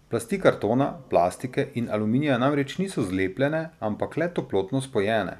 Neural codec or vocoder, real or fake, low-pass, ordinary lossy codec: none; real; 14.4 kHz; none